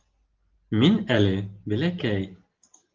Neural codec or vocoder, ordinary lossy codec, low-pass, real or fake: none; Opus, 16 kbps; 7.2 kHz; real